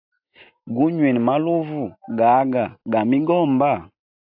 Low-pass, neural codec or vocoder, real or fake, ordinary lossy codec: 5.4 kHz; none; real; AAC, 48 kbps